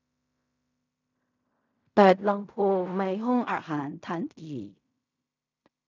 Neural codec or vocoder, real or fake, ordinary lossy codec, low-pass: codec, 16 kHz in and 24 kHz out, 0.4 kbps, LongCat-Audio-Codec, fine tuned four codebook decoder; fake; none; 7.2 kHz